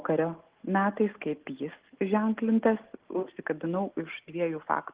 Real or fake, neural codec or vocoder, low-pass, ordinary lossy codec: real; none; 3.6 kHz; Opus, 16 kbps